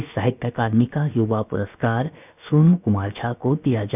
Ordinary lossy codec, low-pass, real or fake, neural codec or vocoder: none; 3.6 kHz; fake; codec, 16 kHz, about 1 kbps, DyCAST, with the encoder's durations